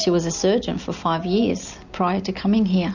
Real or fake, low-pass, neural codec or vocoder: real; 7.2 kHz; none